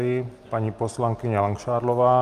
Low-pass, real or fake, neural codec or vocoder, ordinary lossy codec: 14.4 kHz; real; none; Opus, 24 kbps